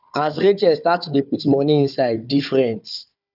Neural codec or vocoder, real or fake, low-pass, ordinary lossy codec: codec, 16 kHz, 4 kbps, FunCodec, trained on Chinese and English, 50 frames a second; fake; 5.4 kHz; none